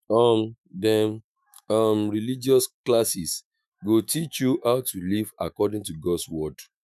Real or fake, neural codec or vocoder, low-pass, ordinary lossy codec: fake; autoencoder, 48 kHz, 128 numbers a frame, DAC-VAE, trained on Japanese speech; 14.4 kHz; none